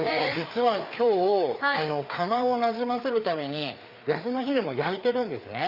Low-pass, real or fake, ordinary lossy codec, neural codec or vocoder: 5.4 kHz; fake; Opus, 64 kbps; codec, 16 kHz, 4 kbps, FreqCodec, larger model